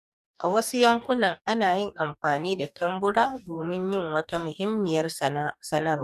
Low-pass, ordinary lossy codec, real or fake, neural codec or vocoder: 14.4 kHz; none; fake; codec, 44.1 kHz, 2.6 kbps, DAC